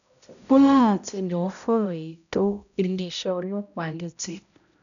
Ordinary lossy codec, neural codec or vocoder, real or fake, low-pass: none; codec, 16 kHz, 0.5 kbps, X-Codec, HuBERT features, trained on balanced general audio; fake; 7.2 kHz